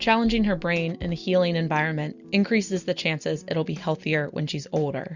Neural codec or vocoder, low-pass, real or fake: none; 7.2 kHz; real